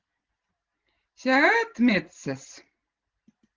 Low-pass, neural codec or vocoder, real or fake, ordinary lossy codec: 7.2 kHz; none; real; Opus, 16 kbps